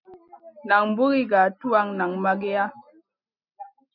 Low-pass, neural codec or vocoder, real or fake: 5.4 kHz; none; real